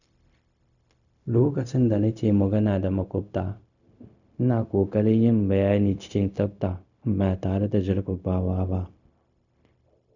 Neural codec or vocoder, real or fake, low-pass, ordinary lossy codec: codec, 16 kHz, 0.4 kbps, LongCat-Audio-Codec; fake; 7.2 kHz; none